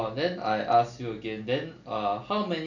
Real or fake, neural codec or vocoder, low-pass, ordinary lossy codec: real; none; 7.2 kHz; none